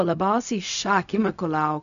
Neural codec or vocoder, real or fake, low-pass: codec, 16 kHz, 0.4 kbps, LongCat-Audio-Codec; fake; 7.2 kHz